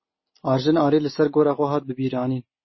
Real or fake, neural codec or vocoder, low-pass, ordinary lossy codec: real; none; 7.2 kHz; MP3, 24 kbps